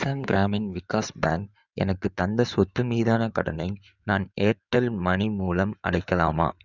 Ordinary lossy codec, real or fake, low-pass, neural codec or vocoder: none; fake; 7.2 kHz; codec, 16 kHz in and 24 kHz out, 2.2 kbps, FireRedTTS-2 codec